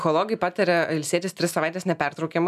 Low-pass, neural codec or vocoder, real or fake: 14.4 kHz; none; real